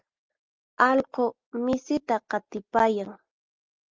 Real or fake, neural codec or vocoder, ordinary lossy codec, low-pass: real; none; Opus, 32 kbps; 7.2 kHz